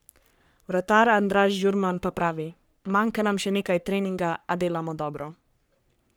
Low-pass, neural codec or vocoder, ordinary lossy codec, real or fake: none; codec, 44.1 kHz, 3.4 kbps, Pupu-Codec; none; fake